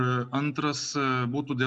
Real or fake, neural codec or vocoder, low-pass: real; none; 9.9 kHz